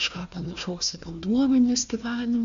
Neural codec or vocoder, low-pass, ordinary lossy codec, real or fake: codec, 16 kHz, 1 kbps, FunCodec, trained on Chinese and English, 50 frames a second; 7.2 kHz; AAC, 64 kbps; fake